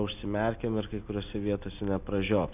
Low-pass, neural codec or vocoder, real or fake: 3.6 kHz; none; real